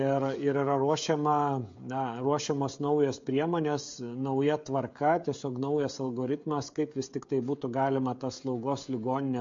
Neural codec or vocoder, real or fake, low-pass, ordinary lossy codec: codec, 16 kHz, 16 kbps, FreqCodec, smaller model; fake; 7.2 kHz; MP3, 48 kbps